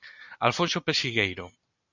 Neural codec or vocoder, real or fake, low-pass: none; real; 7.2 kHz